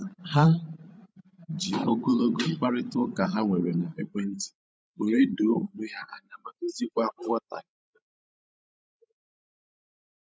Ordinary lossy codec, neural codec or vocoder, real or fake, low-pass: none; codec, 16 kHz, 16 kbps, FreqCodec, larger model; fake; none